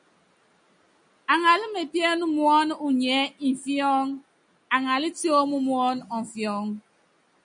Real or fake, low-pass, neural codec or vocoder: real; 9.9 kHz; none